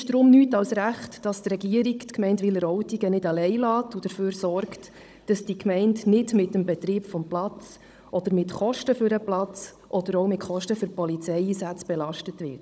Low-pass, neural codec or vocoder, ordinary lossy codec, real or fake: none; codec, 16 kHz, 16 kbps, FunCodec, trained on Chinese and English, 50 frames a second; none; fake